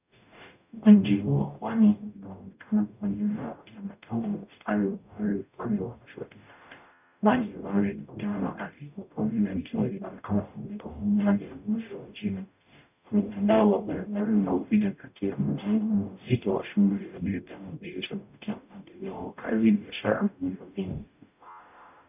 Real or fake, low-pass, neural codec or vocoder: fake; 3.6 kHz; codec, 44.1 kHz, 0.9 kbps, DAC